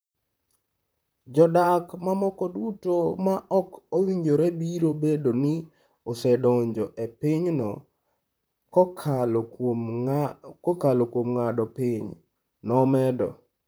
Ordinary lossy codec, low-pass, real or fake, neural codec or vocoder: none; none; fake; vocoder, 44.1 kHz, 128 mel bands, Pupu-Vocoder